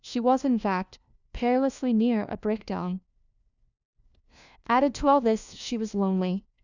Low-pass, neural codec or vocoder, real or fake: 7.2 kHz; codec, 16 kHz, 1 kbps, FunCodec, trained on LibriTTS, 50 frames a second; fake